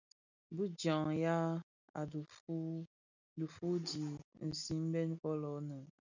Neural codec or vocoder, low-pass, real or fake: none; 7.2 kHz; real